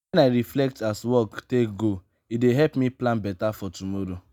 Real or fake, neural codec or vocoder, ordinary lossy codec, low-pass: real; none; none; none